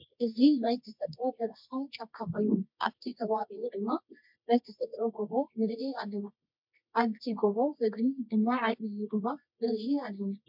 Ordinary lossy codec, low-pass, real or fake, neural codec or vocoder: MP3, 48 kbps; 5.4 kHz; fake; codec, 24 kHz, 0.9 kbps, WavTokenizer, medium music audio release